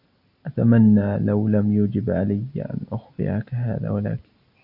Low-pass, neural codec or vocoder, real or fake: 5.4 kHz; none; real